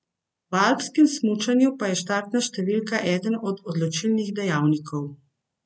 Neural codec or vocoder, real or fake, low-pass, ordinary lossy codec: none; real; none; none